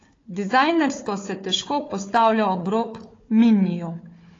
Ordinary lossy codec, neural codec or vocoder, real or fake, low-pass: AAC, 32 kbps; codec, 16 kHz, 4 kbps, FunCodec, trained on Chinese and English, 50 frames a second; fake; 7.2 kHz